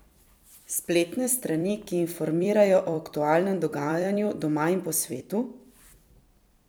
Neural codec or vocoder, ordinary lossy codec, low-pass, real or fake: vocoder, 44.1 kHz, 128 mel bands every 256 samples, BigVGAN v2; none; none; fake